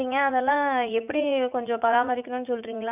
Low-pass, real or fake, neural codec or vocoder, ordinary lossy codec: 3.6 kHz; fake; vocoder, 44.1 kHz, 80 mel bands, Vocos; none